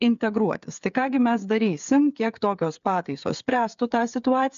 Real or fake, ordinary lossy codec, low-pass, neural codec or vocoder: fake; AAC, 96 kbps; 7.2 kHz; codec, 16 kHz, 8 kbps, FreqCodec, smaller model